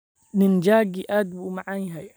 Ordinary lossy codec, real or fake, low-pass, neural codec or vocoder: none; fake; none; vocoder, 44.1 kHz, 128 mel bands every 256 samples, BigVGAN v2